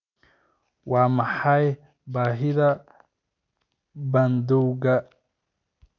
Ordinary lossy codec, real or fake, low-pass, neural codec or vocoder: none; real; 7.2 kHz; none